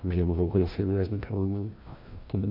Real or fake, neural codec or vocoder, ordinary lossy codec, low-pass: fake; codec, 16 kHz, 1 kbps, FreqCodec, larger model; MP3, 32 kbps; 5.4 kHz